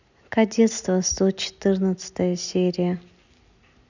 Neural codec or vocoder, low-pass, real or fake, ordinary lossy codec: none; 7.2 kHz; real; none